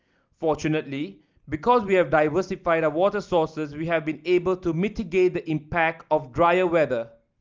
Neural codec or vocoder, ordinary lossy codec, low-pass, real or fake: none; Opus, 24 kbps; 7.2 kHz; real